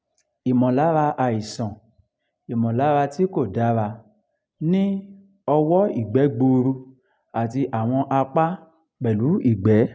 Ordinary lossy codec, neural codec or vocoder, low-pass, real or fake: none; none; none; real